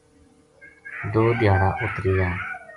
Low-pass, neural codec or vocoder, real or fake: 10.8 kHz; none; real